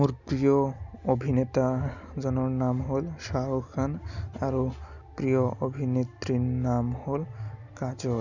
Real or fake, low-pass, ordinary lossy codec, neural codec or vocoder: real; 7.2 kHz; none; none